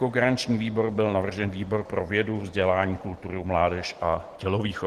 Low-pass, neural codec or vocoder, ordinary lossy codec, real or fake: 14.4 kHz; none; Opus, 16 kbps; real